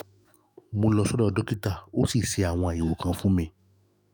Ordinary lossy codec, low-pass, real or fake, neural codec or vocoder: none; none; fake; autoencoder, 48 kHz, 128 numbers a frame, DAC-VAE, trained on Japanese speech